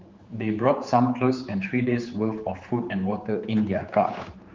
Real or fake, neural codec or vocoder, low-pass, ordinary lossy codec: fake; codec, 16 kHz, 4 kbps, X-Codec, HuBERT features, trained on general audio; 7.2 kHz; Opus, 32 kbps